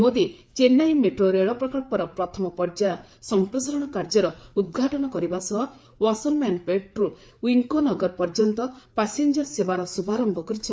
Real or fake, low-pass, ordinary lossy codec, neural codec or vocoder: fake; none; none; codec, 16 kHz, 4 kbps, FreqCodec, larger model